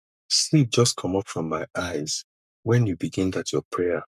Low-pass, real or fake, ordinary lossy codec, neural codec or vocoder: 14.4 kHz; fake; MP3, 96 kbps; codec, 44.1 kHz, 7.8 kbps, Pupu-Codec